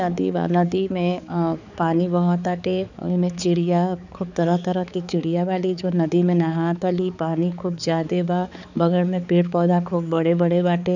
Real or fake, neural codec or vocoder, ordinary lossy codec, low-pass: fake; codec, 16 kHz, 4 kbps, X-Codec, HuBERT features, trained on balanced general audio; none; 7.2 kHz